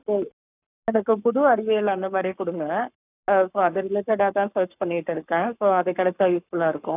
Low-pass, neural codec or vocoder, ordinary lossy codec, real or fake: 3.6 kHz; codec, 44.1 kHz, 7.8 kbps, Pupu-Codec; none; fake